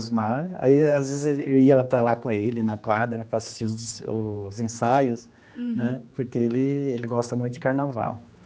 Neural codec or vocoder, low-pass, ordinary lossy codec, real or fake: codec, 16 kHz, 2 kbps, X-Codec, HuBERT features, trained on general audio; none; none; fake